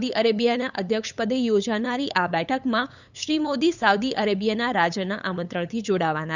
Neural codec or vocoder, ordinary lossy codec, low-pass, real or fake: codec, 16 kHz, 8 kbps, FunCodec, trained on LibriTTS, 25 frames a second; none; 7.2 kHz; fake